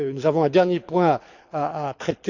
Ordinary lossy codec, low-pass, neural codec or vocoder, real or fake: none; 7.2 kHz; codec, 24 kHz, 6 kbps, HILCodec; fake